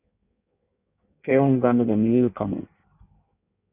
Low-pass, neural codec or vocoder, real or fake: 3.6 kHz; codec, 16 kHz in and 24 kHz out, 1.1 kbps, FireRedTTS-2 codec; fake